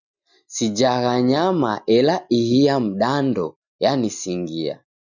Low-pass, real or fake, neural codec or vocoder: 7.2 kHz; real; none